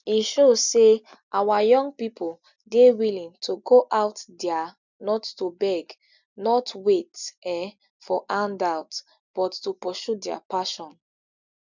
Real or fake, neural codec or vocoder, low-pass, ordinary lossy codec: real; none; 7.2 kHz; none